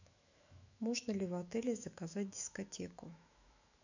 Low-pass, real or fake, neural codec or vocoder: 7.2 kHz; fake; autoencoder, 48 kHz, 128 numbers a frame, DAC-VAE, trained on Japanese speech